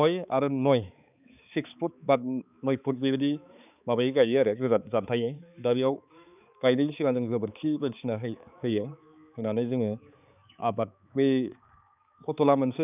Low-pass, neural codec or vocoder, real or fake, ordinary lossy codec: 3.6 kHz; codec, 16 kHz, 4 kbps, X-Codec, HuBERT features, trained on balanced general audio; fake; none